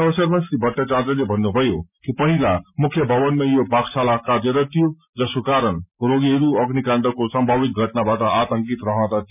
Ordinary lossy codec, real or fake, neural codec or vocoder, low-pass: none; real; none; 3.6 kHz